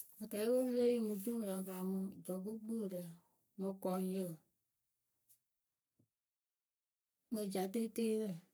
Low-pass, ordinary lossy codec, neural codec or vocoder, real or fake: none; none; codec, 44.1 kHz, 3.4 kbps, Pupu-Codec; fake